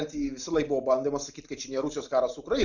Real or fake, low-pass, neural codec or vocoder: real; 7.2 kHz; none